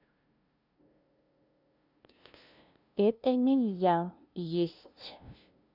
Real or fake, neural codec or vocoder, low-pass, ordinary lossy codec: fake; codec, 16 kHz, 0.5 kbps, FunCodec, trained on LibriTTS, 25 frames a second; 5.4 kHz; none